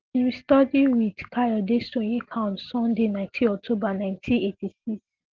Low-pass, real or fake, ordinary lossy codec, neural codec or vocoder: 7.2 kHz; real; Opus, 16 kbps; none